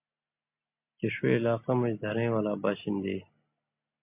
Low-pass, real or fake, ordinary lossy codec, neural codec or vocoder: 3.6 kHz; real; MP3, 32 kbps; none